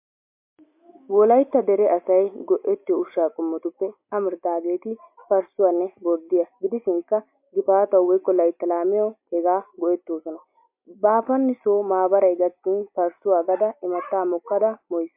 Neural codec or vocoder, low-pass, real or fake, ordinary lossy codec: none; 3.6 kHz; real; MP3, 32 kbps